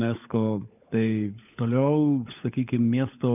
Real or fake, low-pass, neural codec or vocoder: fake; 3.6 kHz; codec, 16 kHz, 16 kbps, FunCodec, trained on LibriTTS, 50 frames a second